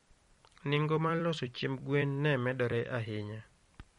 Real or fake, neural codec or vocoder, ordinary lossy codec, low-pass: fake; vocoder, 44.1 kHz, 128 mel bands every 256 samples, BigVGAN v2; MP3, 48 kbps; 19.8 kHz